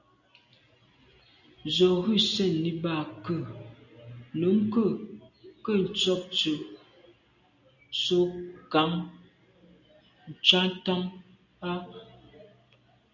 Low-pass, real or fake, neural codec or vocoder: 7.2 kHz; real; none